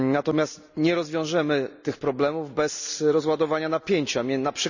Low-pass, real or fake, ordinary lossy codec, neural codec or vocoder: 7.2 kHz; real; none; none